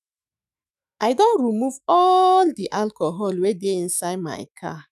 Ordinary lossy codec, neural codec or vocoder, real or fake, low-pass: none; autoencoder, 48 kHz, 128 numbers a frame, DAC-VAE, trained on Japanese speech; fake; 14.4 kHz